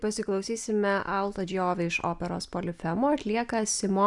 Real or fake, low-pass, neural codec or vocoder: real; 10.8 kHz; none